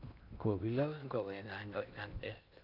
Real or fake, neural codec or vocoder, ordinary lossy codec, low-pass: fake; codec, 16 kHz in and 24 kHz out, 0.6 kbps, FocalCodec, streaming, 2048 codes; none; 5.4 kHz